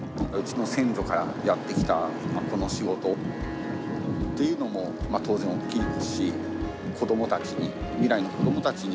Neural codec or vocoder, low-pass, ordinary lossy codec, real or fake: none; none; none; real